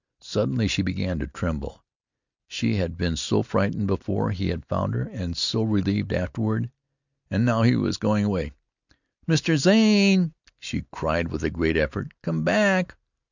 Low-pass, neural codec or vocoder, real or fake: 7.2 kHz; none; real